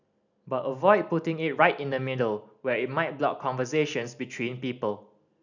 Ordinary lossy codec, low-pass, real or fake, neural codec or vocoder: none; 7.2 kHz; real; none